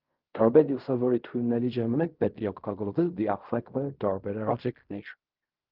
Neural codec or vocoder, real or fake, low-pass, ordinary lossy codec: codec, 16 kHz in and 24 kHz out, 0.4 kbps, LongCat-Audio-Codec, fine tuned four codebook decoder; fake; 5.4 kHz; Opus, 24 kbps